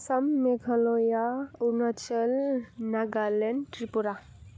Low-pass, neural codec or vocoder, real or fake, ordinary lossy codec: none; none; real; none